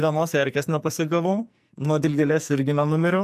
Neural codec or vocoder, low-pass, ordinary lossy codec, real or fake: codec, 44.1 kHz, 2.6 kbps, SNAC; 14.4 kHz; AAC, 96 kbps; fake